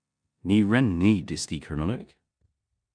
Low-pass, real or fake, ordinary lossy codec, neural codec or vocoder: 9.9 kHz; fake; MP3, 96 kbps; codec, 16 kHz in and 24 kHz out, 0.9 kbps, LongCat-Audio-Codec, four codebook decoder